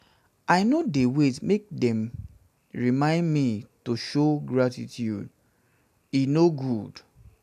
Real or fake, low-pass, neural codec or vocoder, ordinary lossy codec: real; 14.4 kHz; none; none